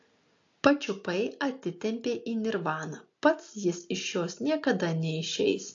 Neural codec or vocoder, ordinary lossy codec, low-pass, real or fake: none; AAC, 48 kbps; 7.2 kHz; real